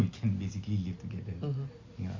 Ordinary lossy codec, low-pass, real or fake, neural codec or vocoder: MP3, 64 kbps; 7.2 kHz; real; none